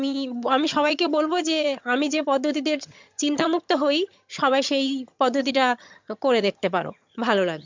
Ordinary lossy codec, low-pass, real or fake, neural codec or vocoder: MP3, 64 kbps; 7.2 kHz; fake; vocoder, 22.05 kHz, 80 mel bands, HiFi-GAN